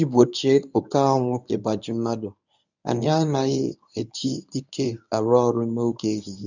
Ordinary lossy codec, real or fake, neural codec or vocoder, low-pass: none; fake; codec, 24 kHz, 0.9 kbps, WavTokenizer, medium speech release version 1; 7.2 kHz